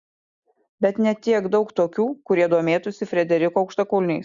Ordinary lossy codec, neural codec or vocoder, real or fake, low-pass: Opus, 64 kbps; none; real; 7.2 kHz